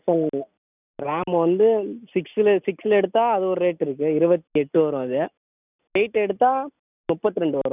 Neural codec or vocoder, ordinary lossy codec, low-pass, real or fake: none; none; 3.6 kHz; real